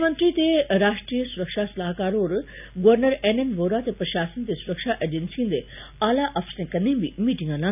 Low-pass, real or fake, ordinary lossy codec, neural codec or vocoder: 3.6 kHz; real; none; none